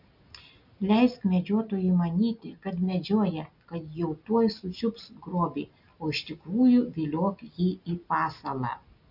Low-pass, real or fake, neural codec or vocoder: 5.4 kHz; real; none